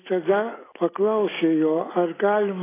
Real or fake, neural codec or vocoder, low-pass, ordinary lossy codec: real; none; 3.6 kHz; AAC, 16 kbps